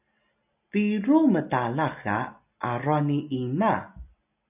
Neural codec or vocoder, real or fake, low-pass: none; real; 3.6 kHz